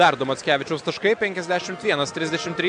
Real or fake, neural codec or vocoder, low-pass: real; none; 9.9 kHz